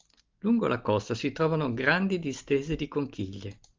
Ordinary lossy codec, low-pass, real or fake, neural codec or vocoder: Opus, 32 kbps; 7.2 kHz; real; none